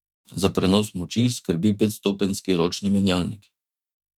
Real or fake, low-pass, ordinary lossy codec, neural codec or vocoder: fake; 19.8 kHz; none; autoencoder, 48 kHz, 32 numbers a frame, DAC-VAE, trained on Japanese speech